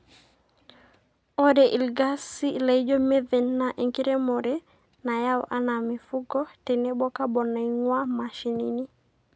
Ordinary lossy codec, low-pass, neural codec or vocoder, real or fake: none; none; none; real